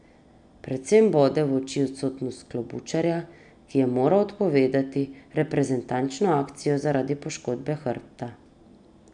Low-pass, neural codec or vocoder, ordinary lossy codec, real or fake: 9.9 kHz; none; none; real